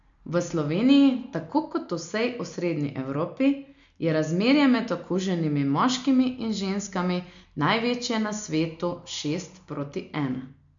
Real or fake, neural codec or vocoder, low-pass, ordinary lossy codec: real; none; 7.2 kHz; MP3, 64 kbps